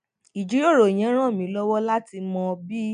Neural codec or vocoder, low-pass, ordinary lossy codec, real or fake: none; 9.9 kHz; none; real